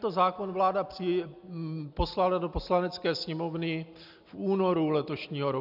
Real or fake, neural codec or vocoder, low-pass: real; none; 5.4 kHz